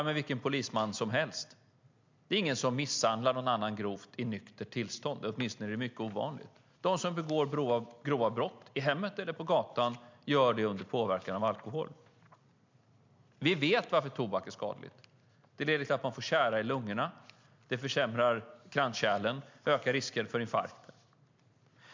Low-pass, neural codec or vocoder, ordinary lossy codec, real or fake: 7.2 kHz; none; MP3, 64 kbps; real